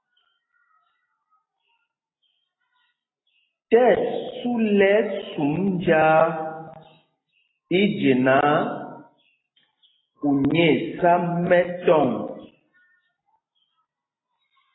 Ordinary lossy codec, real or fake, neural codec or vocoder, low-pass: AAC, 16 kbps; real; none; 7.2 kHz